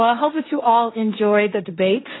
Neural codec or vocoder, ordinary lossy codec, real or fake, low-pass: codec, 16 kHz, 1.1 kbps, Voila-Tokenizer; AAC, 16 kbps; fake; 7.2 kHz